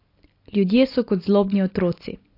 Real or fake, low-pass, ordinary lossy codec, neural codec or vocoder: real; 5.4 kHz; AAC, 32 kbps; none